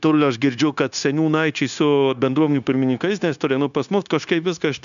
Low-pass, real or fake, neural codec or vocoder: 7.2 kHz; fake; codec, 16 kHz, 0.9 kbps, LongCat-Audio-Codec